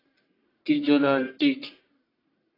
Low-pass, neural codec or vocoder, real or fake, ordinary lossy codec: 5.4 kHz; codec, 44.1 kHz, 1.7 kbps, Pupu-Codec; fake; AAC, 24 kbps